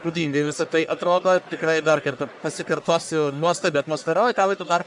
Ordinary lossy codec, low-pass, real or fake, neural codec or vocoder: MP3, 96 kbps; 10.8 kHz; fake; codec, 44.1 kHz, 1.7 kbps, Pupu-Codec